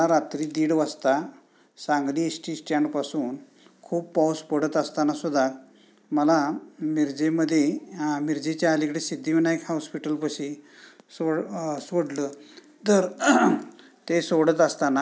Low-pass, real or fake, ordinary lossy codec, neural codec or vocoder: none; real; none; none